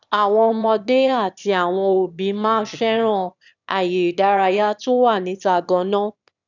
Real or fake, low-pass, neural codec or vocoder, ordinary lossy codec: fake; 7.2 kHz; autoencoder, 22.05 kHz, a latent of 192 numbers a frame, VITS, trained on one speaker; none